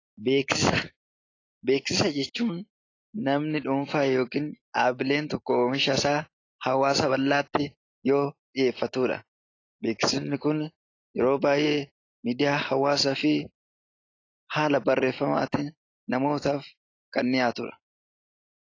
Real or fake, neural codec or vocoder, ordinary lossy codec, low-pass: real; none; AAC, 32 kbps; 7.2 kHz